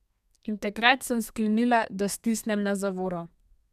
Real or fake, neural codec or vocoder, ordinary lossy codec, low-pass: fake; codec, 32 kHz, 1.9 kbps, SNAC; none; 14.4 kHz